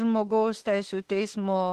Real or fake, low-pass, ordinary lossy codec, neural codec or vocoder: fake; 14.4 kHz; Opus, 16 kbps; autoencoder, 48 kHz, 32 numbers a frame, DAC-VAE, trained on Japanese speech